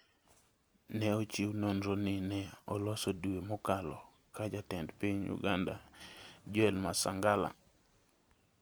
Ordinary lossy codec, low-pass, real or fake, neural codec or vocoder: none; none; real; none